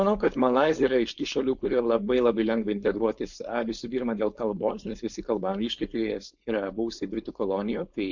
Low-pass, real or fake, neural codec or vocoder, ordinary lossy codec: 7.2 kHz; fake; codec, 16 kHz, 4.8 kbps, FACodec; MP3, 64 kbps